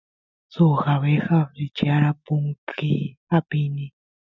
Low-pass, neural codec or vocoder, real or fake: 7.2 kHz; none; real